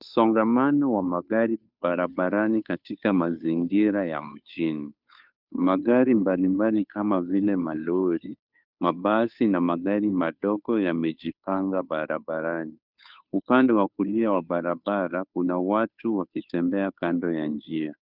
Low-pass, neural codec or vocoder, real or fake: 5.4 kHz; codec, 16 kHz, 2 kbps, FunCodec, trained on Chinese and English, 25 frames a second; fake